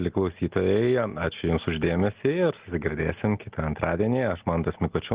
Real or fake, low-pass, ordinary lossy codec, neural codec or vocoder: real; 3.6 kHz; Opus, 16 kbps; none